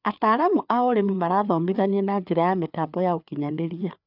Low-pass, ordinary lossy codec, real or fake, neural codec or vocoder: 5.4 kHz; none; fake; codec, 16 kHz, 4 kbps, FreqCodec, larger model